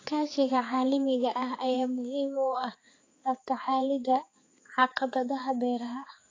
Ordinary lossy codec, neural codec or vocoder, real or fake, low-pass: AAC, 32 kbps; codec, 16 kHz, 4 kbps, X-Codec, HuBERT features, trained on balanced general audio; fake; 7.2 kHz